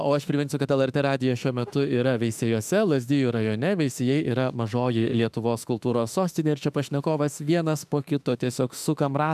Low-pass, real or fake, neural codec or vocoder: 14.4 kHz; fake; autoencoder, 48 kHz, 32 numbers a frame, DAC-VAE, trained on Japanese speech